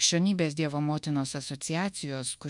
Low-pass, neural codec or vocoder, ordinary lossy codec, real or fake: 10.8 kHz; codec, 24 kHz, 1.2 kbps, DualCodec; MP3, 96 kbps; fake